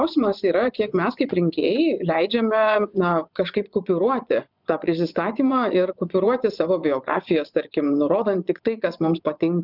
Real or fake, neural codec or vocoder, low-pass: fake; vocoder, 24 kHz, 100 mel bands, Vocos; 5.4 kHz